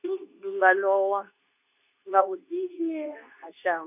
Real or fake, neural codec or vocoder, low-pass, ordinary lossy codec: fake; codec, 24 kHz, 0.9 kbps, WavTokenizer, medium speech release version 2; 3.6 kHz; none